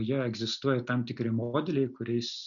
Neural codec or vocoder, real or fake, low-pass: none; real; 7.2 kHz